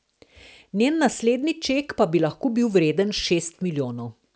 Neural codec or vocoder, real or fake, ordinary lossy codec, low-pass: none; real; none; none